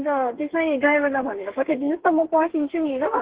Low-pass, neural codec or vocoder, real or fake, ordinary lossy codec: 3.6 kHz; codec, 32 kHz, 1.9 kbps, SNAC; fake; Opus, 16 kbps